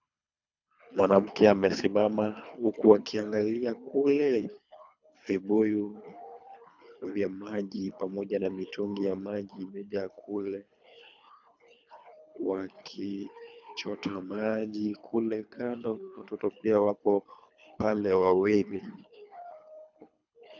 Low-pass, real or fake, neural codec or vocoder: 7.2 kHz; fake; codec, 24 kHz, 3 kbps, HILCodec